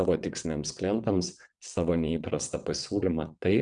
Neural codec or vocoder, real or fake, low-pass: vocoder, 22.05 kHz, 80 mel bands, WaveNeXt; fake; 9.9 kHz